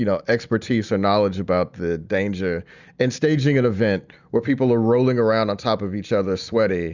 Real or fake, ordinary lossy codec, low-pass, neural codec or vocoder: real; Opus, 64 kbps; 7.2 kHz; none